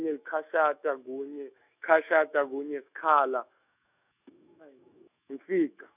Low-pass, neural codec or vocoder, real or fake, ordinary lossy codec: 3.6 kHz; autoencoder, 48 kHz, 128 numbers a frame, DAC-VAE, trained on Japanese speech; fake; none